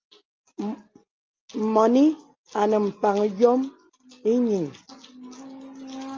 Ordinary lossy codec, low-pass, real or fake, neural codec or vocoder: Opus, 24 kbps; 7.2 kHz; real; none